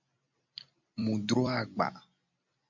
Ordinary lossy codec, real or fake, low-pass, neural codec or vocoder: MP3, 48 kbps; fake; 7.2 kHz; vocoder, 44.1 kHz, 128 mel bands every 256 samples, BigVGAN v2